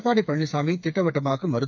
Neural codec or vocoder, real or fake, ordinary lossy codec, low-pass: codec, 16 kHz, 4 kbps, FreqCodec, smaller model; fake; none; 7.2 kHz